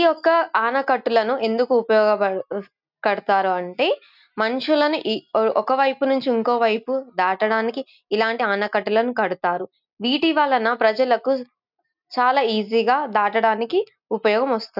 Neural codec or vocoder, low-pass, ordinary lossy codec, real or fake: none; 5.4 kHz; none; real